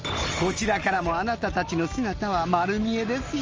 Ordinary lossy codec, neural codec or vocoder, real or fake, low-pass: Opus, 24 kbps; none; real; 7.2 kHz